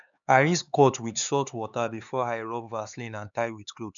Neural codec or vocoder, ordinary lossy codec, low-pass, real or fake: codec, 16 kHz, 4 kbps, X-Codec, HuBERT features, trained on LibriSpeech; none; 7.2 kHz; fake